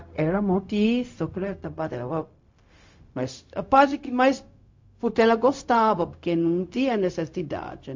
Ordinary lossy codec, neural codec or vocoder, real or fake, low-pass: MP3, 48 kbps; codec, 16 kHz, 0.4 kbps, LongCat-Audio-Codec; fake; 7.2 kHz